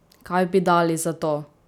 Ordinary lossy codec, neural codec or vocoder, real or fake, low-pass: none; none; real; 19.8 kHz